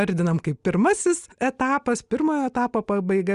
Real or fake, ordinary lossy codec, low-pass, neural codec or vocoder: real; Opus, 64 kbps; 10.8 kHz; none